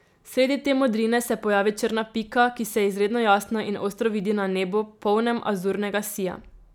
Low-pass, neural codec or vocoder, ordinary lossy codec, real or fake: 19.8 kHz; none; none; real